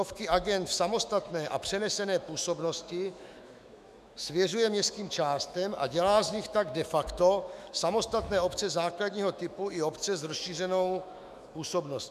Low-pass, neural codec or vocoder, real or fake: 14.4 kHz; autoencoder, 48 kHz, 128 numbers a frame, DAC-VAE, trained on Japanese speech; fake